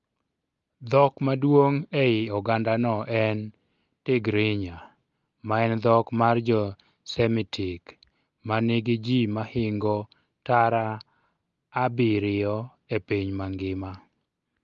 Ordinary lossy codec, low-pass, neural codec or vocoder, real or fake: Opus, 32 kbps; 7.2 kHz; none; real